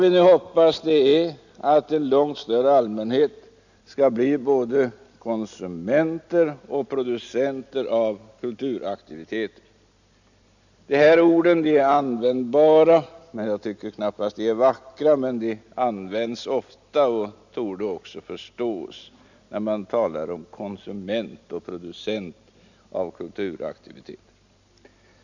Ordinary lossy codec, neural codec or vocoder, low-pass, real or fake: none; none; 7.2 kHz; real